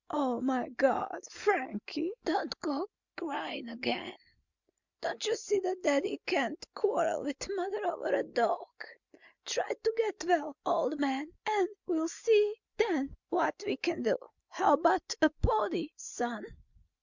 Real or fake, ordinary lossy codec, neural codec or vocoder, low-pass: real; Opus, 64 kbps; none; 7.2 kHz